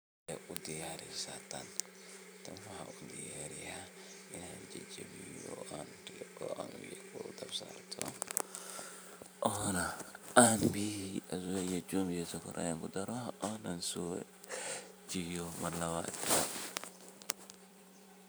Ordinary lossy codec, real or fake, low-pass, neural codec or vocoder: none; fake; none; vocoder, 44.1 kHz, 128 mel bands every 512 samples, BigVGAN v2